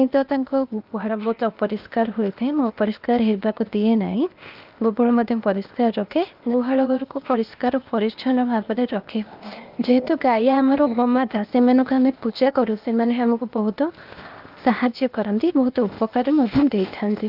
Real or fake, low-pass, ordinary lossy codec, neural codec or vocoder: fake; 5.4 kHz; Opus, 24 kbps; codec, 16 kHz, 0.8 kbps, ZipCodec